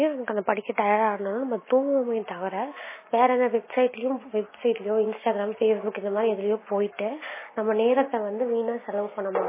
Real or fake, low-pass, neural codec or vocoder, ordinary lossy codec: real; 3.6 kHz; none; MP3, 16 kbps